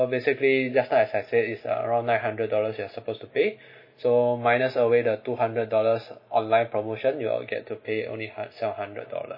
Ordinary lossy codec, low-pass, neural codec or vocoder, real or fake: MP3, 24 kbps; 5.4 kHz; none; real